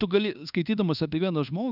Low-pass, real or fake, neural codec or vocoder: 5.4 kHz; fake; codec, 24 kHz, 1.2 kbps, DualCodec